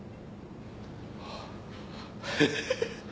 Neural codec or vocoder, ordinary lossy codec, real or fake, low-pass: none; none; real; none